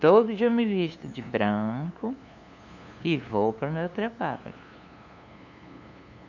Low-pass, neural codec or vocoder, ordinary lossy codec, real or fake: 7.2 kHz; codec, 16 kHz, 2 kbps, FunCodec, trained on LibriTTS, 25 frames a second; none; fake